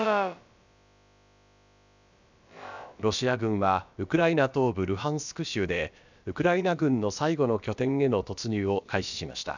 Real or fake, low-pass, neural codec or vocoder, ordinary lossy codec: fake; 7.2 kHz; codec, 16 kHz, about 1 kbps, DyCAST, with the encoder's durations; none